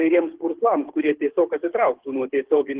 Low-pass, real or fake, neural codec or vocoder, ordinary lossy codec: 3.6 kHz; fake; codec, 24 kHz, 6 kbps, HILCodec; Opus, 16 kbps